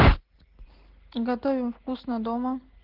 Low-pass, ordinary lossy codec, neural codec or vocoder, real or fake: 5.4 kHz; Opus, 16 kbps; none; real